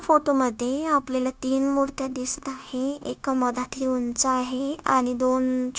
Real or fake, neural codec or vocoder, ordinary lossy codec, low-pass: fake; codec, 16 kHz, 0.9 kbps, LongCat-Audio-Codec; none; none